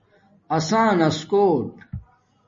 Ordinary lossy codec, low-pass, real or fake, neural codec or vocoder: MP3, 32 kbps; 7.2 kHz; real; none